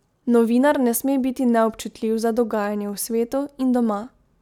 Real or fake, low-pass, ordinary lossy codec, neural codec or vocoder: real; 19.8 kHz; none; none